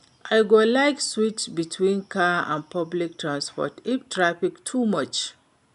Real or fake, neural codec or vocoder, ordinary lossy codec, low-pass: real; none; none; 10.8 kHz